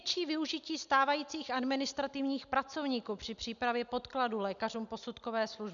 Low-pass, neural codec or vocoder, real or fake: 7.2 kHz; none; real